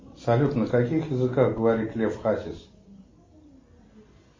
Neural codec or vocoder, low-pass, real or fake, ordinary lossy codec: none; 7.2 kHz; real; MP3, 32 kbps